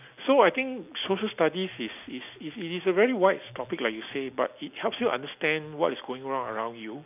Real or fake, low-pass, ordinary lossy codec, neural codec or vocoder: real; 3.6 kHz; none; none